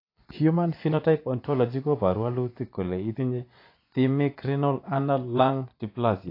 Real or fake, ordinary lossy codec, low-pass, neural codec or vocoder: fake; AAC, 32 kbps; 5.4 kHz; vocoder, 24 kHz, 100 mel bands, Vocos